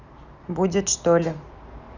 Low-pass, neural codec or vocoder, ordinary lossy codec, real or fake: 7.2 kHz; none; none; real